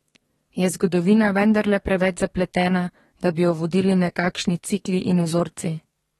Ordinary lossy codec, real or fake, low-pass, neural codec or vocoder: AAC, 32 kbps; fake; 14.4 kHz; codec, 32 kHz, 1.9 kbps, SNAC